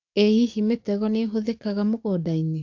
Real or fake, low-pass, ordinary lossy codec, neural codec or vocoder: fake; 7.2 kHz; AAC, 48 kbps; codec, 44.1 kHz, 7.8 kbps, DAC